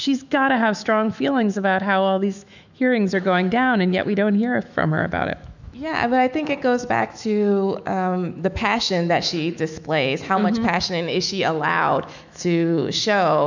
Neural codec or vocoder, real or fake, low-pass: autoencoder, 48 kHz, 128 numbers a frame, DAC-VAE, trained on Japanese speech; fake; 7.2 kHz